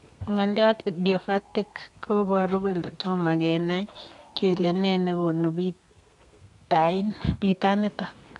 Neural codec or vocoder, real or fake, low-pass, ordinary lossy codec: codec, 32 kHz, 1.9 kbps, SNAC; fake; 10.8 kHz; AAC, 64 kbps